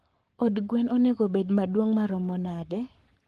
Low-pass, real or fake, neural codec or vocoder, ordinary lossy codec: 14.4 kHz; fake; codec, 44.1 kHz, 7.8 kbps, Pupu-Codec; Opus, 24 kbps